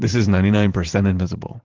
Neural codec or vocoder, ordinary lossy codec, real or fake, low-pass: vocoder, 44.1 kHz, 128 mel bands every 512 samples, BigVGAN v2; Opus, 16 kbps; fake; 7.2 kHz